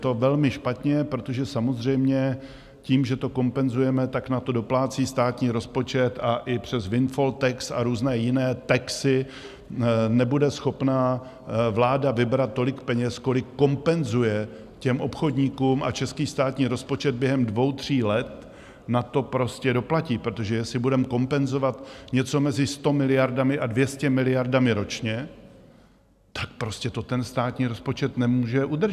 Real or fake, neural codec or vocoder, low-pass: real; none; 14.4 kHz